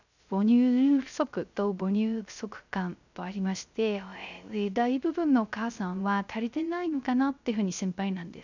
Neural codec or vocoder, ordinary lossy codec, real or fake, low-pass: codec, 16 kHz, 0.3 kbps, FocalCodec; none; fake; 7.2 kHz